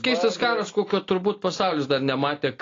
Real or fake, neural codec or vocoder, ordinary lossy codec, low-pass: real; none; AAC, 32 kbps; 7.2 kHz